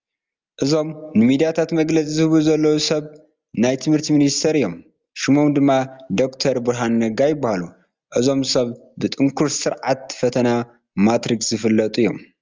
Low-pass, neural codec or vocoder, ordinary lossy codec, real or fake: 7.2 kHz; none; Opus, 32 kbps; real